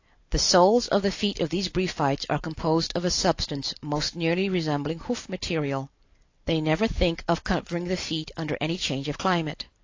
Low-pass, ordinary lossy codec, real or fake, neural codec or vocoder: 7.2 kHz; AAC, 48 kbps; real; none